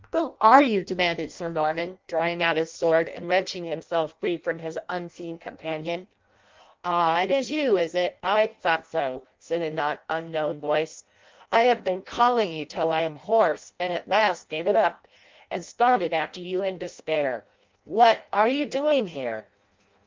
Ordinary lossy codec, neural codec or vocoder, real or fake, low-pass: Opus, 24 kbps; codec, 16 kHz in and 24 kHz out, 0.6 kbps, FireRedTTS-2 codec; fake; 7.2 kHz